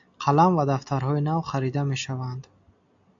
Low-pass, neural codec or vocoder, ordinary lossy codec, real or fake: 7.2 kHz; none; AAC, 64 kbps; real